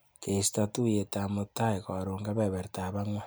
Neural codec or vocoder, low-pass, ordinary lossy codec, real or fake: none; none; none; real